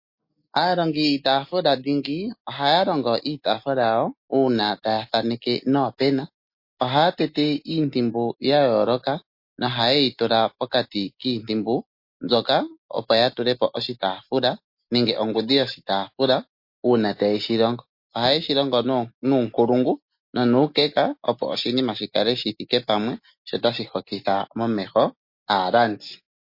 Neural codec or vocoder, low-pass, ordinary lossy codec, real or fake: none; 5.4 kHz; MP3, 32 kbps; real